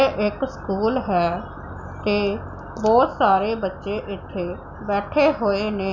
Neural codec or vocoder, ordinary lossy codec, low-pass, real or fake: none; none; 7.2 kHz; real